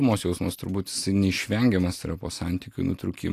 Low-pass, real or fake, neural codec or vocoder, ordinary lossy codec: 14.4 kHz; real; none; AAC, 64 kbps